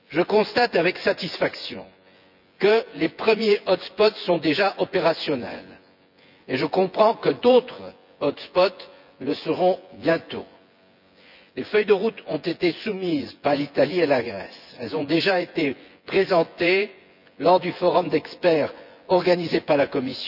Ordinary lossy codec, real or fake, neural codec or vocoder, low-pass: none; fake; vocoder, 24 kHz, 100 mel bands, Vocos; 5.4 kHz